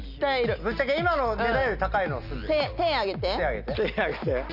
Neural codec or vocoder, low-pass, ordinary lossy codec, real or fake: none; 5.4 kHz; none; real